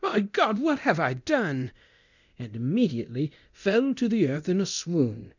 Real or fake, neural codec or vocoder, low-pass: fake; codec, 24 kHz, 0.9 kbps, DualCodec; 7.2 kHz